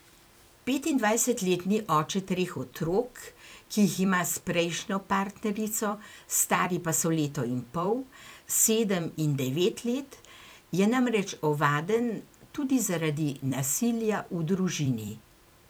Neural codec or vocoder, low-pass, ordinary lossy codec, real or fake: vocoder, 44.1 kHz, 128 mel bands every 256 samples, BigVGAN v2; none; none; fake